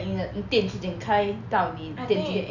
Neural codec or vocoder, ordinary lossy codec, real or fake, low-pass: none; none; real; 7.2 kHz